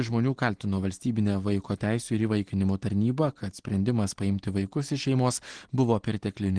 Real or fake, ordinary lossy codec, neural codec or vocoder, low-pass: real; Opus, 16 kbps; none; 9.9 kHz